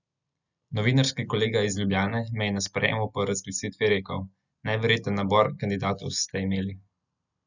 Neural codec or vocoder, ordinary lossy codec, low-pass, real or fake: none; none; 7.2 kHz; real